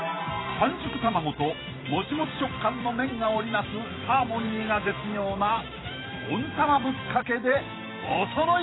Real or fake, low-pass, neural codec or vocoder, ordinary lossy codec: fake; 7.2 kHz; vocoder, 44.1 kHz, 128 mel bands every 512 samples, BigVGAN v2; AAC, 16 kbps